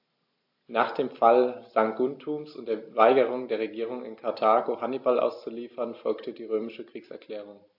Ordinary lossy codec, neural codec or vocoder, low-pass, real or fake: AAC, 48 kbps; none; 5.4 kHz; real